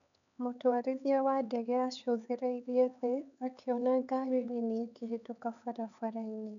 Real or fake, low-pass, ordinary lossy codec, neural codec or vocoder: fake; 7.2 kHz; none; codec, 16 kHz, 4 kbps, X-Codec, HuBERT features, trained on LibriSpeech